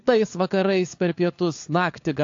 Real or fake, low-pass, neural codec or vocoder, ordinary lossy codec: fake; 7.2 kHz; codec, 16 kHz, 4 kbps, FunCodec, trained on LibriTTS, 50 frames a second; AAC, 48 kbps